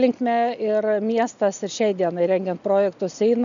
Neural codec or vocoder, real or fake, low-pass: none; real; 7.2 kHz